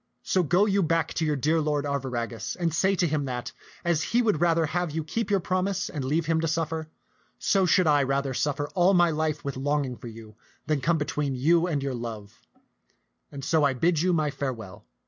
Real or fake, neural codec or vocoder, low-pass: real; none; 7.2 kHz